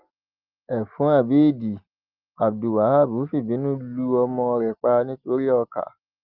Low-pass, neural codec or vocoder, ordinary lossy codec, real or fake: 5.4 kHz; none; Opus, 32 kbps; real